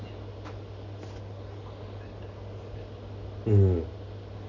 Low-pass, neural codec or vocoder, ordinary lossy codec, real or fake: 7.2 kHz; none; none; real